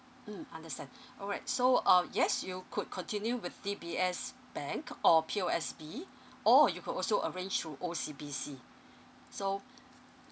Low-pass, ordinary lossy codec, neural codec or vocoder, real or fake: none; none; none; real